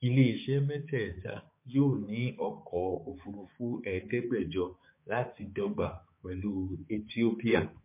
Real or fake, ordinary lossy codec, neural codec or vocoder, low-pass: fake; MP3, 24 kbps; codec, 16 kHz, 4 kbps, X-Codec, HuBERT features, trained on balanced general audio; 3.6 kHz